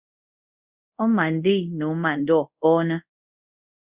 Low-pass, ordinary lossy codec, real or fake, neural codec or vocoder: 3.6 kHz; Opus, 64 kbps; fake; codec, 24 kHz, 0.5 kbps, DualCodec